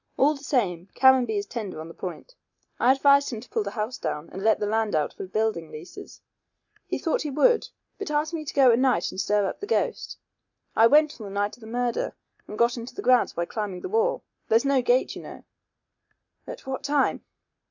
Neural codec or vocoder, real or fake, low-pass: none; real; 7.2 kHz